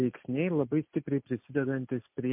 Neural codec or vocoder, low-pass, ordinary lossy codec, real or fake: none; 3.6 kHz; MP3, 32 kbps; real